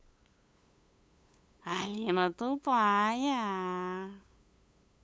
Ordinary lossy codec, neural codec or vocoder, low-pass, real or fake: none; codec, 16 kHz, 8 kbps, FunCodec, trained on LibriTTS, 25 frames a second; none; fake